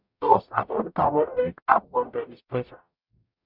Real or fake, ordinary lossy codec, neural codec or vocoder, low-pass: fake; none; codec, 44.1 kHz, 0.9 kbps, DAC; 5.4 kHz